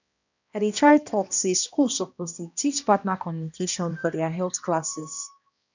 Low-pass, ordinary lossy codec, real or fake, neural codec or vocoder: 7.2 kHz; none; fake; codec, 16 kHz, 1 kbps, X-Codec, HuBERT features, trained on balanced general audio